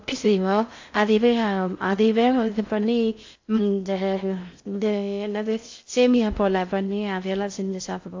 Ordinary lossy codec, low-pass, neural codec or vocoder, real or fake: AAC, 48 kbps; 7.2 kHz; codec, 16 kHz in and 24 kHz out, 0.6 kbps, FocalCodec, streaming, 4096 codes; fake